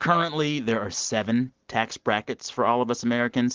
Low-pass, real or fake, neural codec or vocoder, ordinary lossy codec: 7.2 kHz; real; none; Opus, 16 kbps